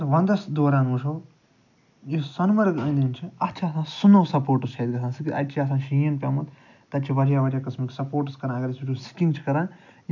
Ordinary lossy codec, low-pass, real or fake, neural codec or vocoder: none; 7.2 kHz; real; none